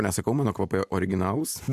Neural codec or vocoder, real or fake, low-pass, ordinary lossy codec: none; real; 14.4 kHz; MP3, 96 kbps